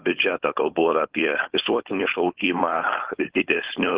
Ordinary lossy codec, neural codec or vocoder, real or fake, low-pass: Opus, 16 kbps; codec, 16 kHz, 4.8 kbps, FACodec; fake; 3.6 kHz